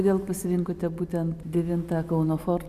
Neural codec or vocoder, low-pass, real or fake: none; 14.4 kHz; real